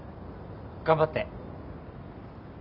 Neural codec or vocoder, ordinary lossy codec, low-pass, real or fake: none; none; 5.4 kHz; real